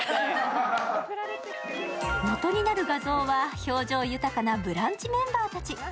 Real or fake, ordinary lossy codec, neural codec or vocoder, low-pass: real; none; none; none